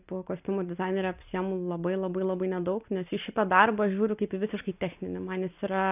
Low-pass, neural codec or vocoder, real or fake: 3.6 kHz; none; real